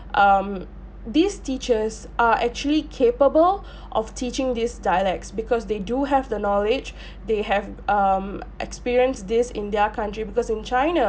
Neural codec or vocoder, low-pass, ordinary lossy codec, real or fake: none; none; none; real